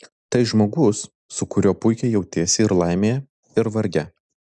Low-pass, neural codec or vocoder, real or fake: 10.8 kHz; none; real